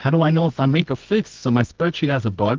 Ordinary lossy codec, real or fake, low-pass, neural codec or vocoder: Opus, 24 kbps; fake; 7.2 kHz; codec, 24 kHz, 0.9 kbps, WavTokenizer, medium music audio release